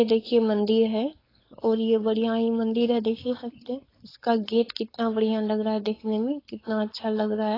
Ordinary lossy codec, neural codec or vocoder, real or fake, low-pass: AAC, 24 kbps; codec, 16 kHz, 4.8 kbps, FACodec; fake; 5.4 kHz